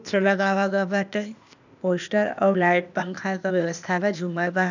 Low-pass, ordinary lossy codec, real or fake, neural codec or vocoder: 7.2 kHz; none; fake; codec, 16 kHz, 0.8 kbps, ZipCodec